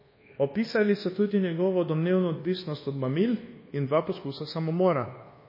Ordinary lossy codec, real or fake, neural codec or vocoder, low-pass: MP3, 24 kbps; fake; codec, 24 kHz, 1.2 kbps, DualCodec; 5.4 kHz